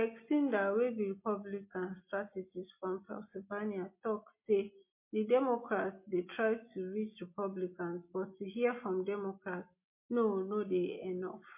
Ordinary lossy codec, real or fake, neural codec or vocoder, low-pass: MP3, 24 kbps; real; none; 3.6 kHz